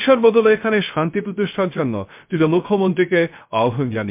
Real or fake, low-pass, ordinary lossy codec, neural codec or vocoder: fake; 3.6 kHz; MP3, 32 kbps; codec, 16 kHz, 0.3 kbps, FocalCodec